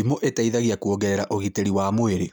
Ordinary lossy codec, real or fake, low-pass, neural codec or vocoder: none; real; none; none